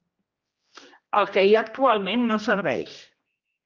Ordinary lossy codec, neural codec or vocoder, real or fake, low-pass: Opus, 24 kbps; codec, 16 kHz, 1 kbps, X-Codec, HuBERT features, trained on general audio; fake; 7.2 kHz